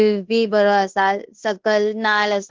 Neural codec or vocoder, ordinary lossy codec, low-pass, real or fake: codec, 16 kHz in and 24 kHz out, 0.9 kbps, LongCat-Audio-Codec, fine tuned four codebook decoder; Opus, 16 kbps; 7.2 kHz; fake